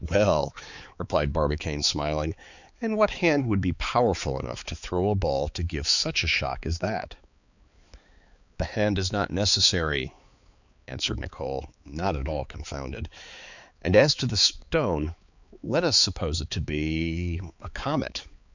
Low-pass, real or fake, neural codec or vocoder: 7.2 kHz; fake; codec, 16 kHz, 4 kbps, X-Codec, HuBERT features, trained on balanced general audio